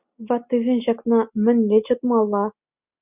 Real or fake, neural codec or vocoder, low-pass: real; none; 3.6 kHz